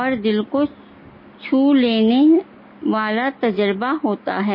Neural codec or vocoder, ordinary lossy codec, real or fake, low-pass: none; MP3, 24 kbps; real; 5.4 kHz